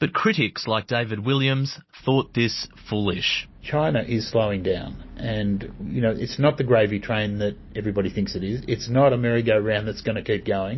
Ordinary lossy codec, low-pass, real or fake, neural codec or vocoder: MP3, 24 kbps; 7.2 kHz; real; none